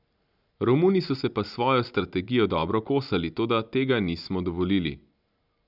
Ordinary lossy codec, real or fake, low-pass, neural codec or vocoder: none; real; 5.4 kHz; none